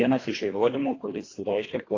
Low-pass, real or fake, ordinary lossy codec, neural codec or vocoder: 7.2 kHz; fake; AAC, 32 kbps; codec, 24 kHz, 1.5 kbps, HILCodec